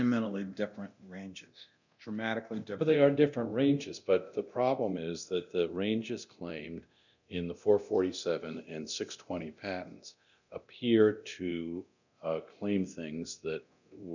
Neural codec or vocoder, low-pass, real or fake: codec, 24 kHz, 0.9 kbps, DualCodec; 7.2 kHz; fake